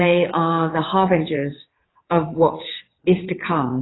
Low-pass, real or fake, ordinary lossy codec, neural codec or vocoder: 7.2 kHz; fake; AAC, 16 kbps; vocoder, 22.05 kHz, 80 mel bands, WaveNeXt